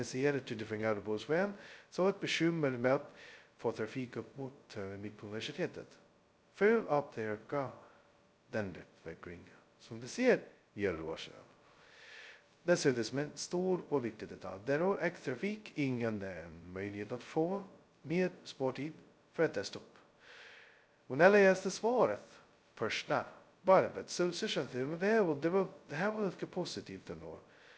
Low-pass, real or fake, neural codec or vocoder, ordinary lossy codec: none; fake; codec, 16 kHz, 0.2 kbps, FocalCodec; none